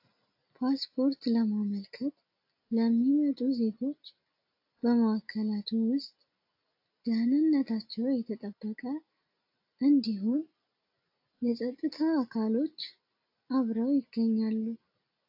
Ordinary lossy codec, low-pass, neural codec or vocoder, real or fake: AAC, 48 kbps; 5.4 kHz; none; real